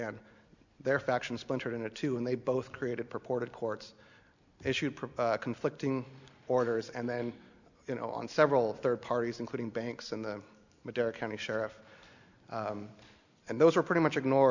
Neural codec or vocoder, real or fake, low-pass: none; real; 7.2 kHz